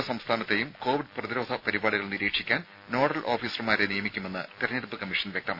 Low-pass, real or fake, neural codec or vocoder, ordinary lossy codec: 5.4 kHz; real; none; none